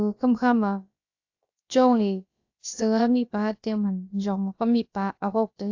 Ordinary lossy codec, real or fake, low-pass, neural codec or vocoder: AAC, 48 kbps; fake; 7.2 kHz; codec, 16 kHz, about 1 kbps, DyCAST, with the encoder's durations